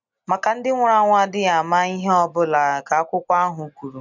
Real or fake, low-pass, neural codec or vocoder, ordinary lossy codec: real; 7.2 kHz; none; none